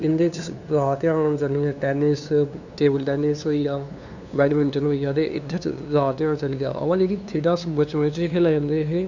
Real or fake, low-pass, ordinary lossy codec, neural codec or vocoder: fake; 7.2 kHz; none; codec, 16 kHz, 2 kbps, FunCodec, trained on LibriTTS, 25 frames a second